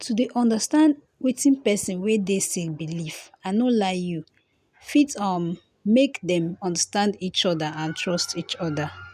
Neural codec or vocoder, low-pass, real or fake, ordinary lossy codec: none; 14.4 kHz; real; none